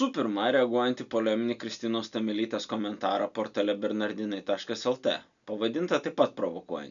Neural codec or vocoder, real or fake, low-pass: none; real; 7.2 kHz